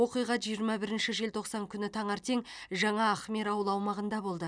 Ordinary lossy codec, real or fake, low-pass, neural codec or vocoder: none; real; 9.9 kHz; none